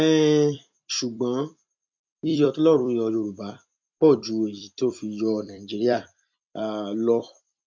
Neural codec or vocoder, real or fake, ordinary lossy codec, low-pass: vocoder, 44.1 kHz, 128 mel bands every 256 samples, BigVGAN v2; fake; MP3, 64 kbps; 7.2 kHz